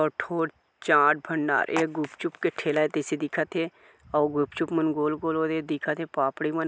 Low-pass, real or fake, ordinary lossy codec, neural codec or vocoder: none; real; none; none